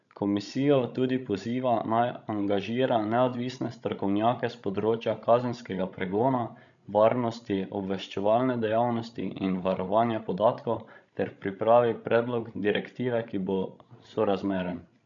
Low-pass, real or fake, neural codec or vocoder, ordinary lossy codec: 7.2 kHz; fake; codec, 16 kHz, 16 kbps, FreqCodec, larger model; none